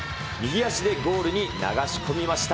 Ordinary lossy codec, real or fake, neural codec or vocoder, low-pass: none; real; none; none